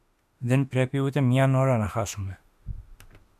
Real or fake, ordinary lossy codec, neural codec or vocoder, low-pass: fake; MP3, 96 kbps; autoencoder, 48 kHz, 32 numbers a frame, DAC-VAE, trained on Japanese speech; 14.4 kHz